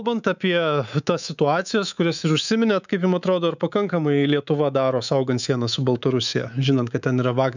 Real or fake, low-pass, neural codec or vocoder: fake; 7.2 kHz; codec, 24 kHz, 3.1 kbps, DualCodec